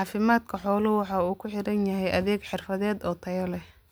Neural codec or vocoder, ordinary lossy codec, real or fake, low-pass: none; none; real; none